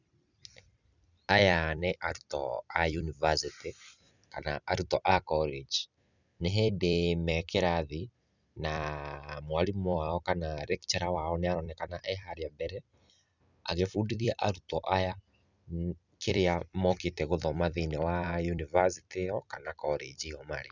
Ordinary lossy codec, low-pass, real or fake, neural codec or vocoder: none; 7.2 kHz; fake; vocoder, 44.1 kHz, 128 mel bands every 256 samples, BigVGAN v2